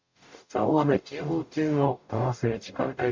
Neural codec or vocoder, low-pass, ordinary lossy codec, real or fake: codec, 44.1 kHz, 0.9 kbps, DAC; 7.2 kHz; MP3, 64 kbps; fake